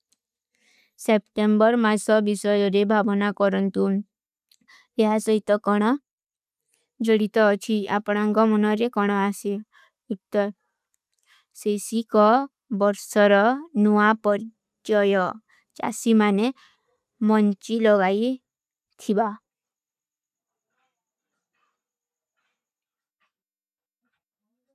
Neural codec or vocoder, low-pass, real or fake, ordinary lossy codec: none; 14.4 kHz; real; none